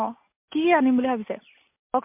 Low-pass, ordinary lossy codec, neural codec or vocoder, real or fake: 3.6 kHz; MP3, 32 kbps; none; real